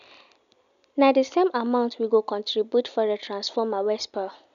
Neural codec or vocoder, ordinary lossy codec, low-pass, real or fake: none; none; 7.2 kHz; real